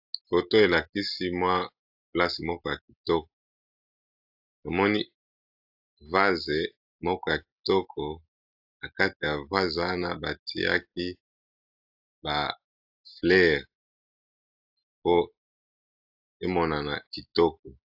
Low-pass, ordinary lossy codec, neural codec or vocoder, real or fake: 5.4 kHz; AAC, 48 kbps; none; real